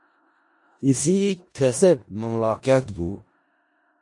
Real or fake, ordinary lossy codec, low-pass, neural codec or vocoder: fake; MP3, 48 kbps; 10.8 kHz; codec, 16 kHz in and 24 kHz out, 0.4 kbps, LongCat-Audio-Codec, four codebook decoder